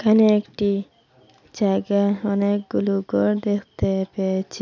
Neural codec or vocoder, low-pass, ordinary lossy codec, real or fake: none; 7.2 kHz; none; real